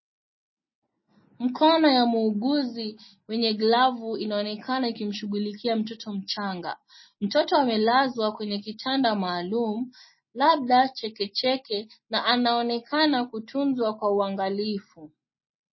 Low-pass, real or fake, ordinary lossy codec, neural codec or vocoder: 7.2 kHz; real; MP3, 24 kbps; none